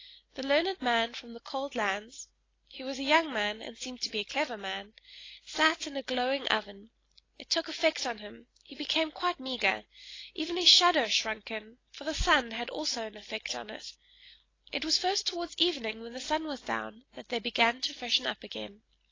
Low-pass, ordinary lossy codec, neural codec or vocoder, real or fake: 7.2 kHz; AAC, 32 kbps; none; real